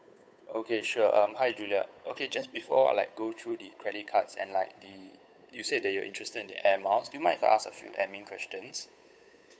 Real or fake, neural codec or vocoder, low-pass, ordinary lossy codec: fake; codec, 16 kHz, 8 kbps, FunCodec, trained on Chinese and English, 25 frames a second; none; none